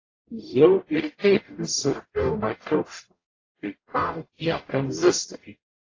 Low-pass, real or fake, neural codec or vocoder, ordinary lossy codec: 7.2 kHz; fake; codec, 44.1 kHz, 0.9 kbps, DAC; AAC, 32 kbps